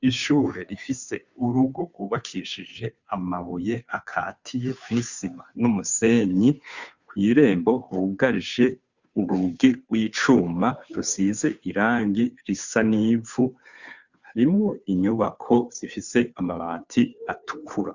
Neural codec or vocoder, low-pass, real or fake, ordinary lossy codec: codec, 16 kHz, 2 kbps, FunCodec, trained on Chinese and English, 25 frames a second; 7.2 kHz; fake; Opus, 64 kbps